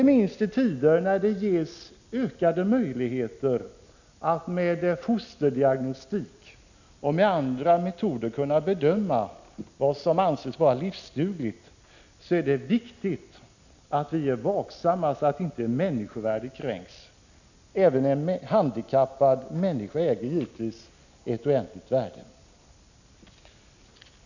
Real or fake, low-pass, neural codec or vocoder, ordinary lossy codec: real; 7.2 kHz; none; none